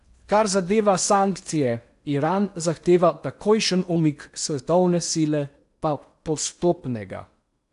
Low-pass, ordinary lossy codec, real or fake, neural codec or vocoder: 10.8 kHz; AAC, 96 kbps; fake; codec, 16 kHz in and 24 kHz out, 0.8 kbps, FocalCodec, streaming, 65536 codes